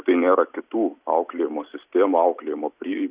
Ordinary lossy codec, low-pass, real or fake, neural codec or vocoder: Opus, 64 kbps; 3.6 kHz; real; none